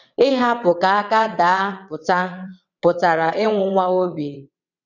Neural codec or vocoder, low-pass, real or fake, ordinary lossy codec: vocoder, 22.05 kHz, 80 mel bands, WaveNeXt; 7.2 kHz; fake; none